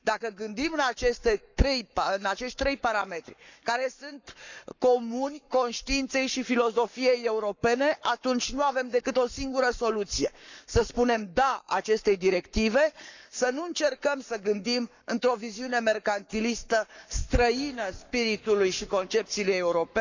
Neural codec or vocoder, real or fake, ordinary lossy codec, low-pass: codec, 44.1 kHz, 7.8 kbps, Pupu-Codec; fake; none; 7.2 kHz